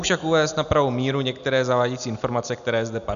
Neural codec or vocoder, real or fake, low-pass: none; real; 7.2 kHz